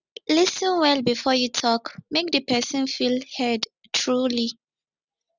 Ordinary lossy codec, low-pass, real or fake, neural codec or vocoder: none; 7.2 kHz; real; none